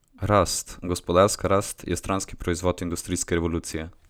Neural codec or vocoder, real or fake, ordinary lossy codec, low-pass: none; real; none; none